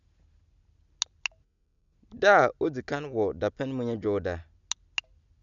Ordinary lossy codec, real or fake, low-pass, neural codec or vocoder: none; real; 7.2 kHz; none